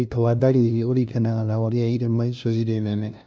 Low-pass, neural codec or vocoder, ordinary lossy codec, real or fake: none; codec, 16 kHz, 0.5 kbps, FunCodec, trained on LibriTTS, 25 frames a second; none; fake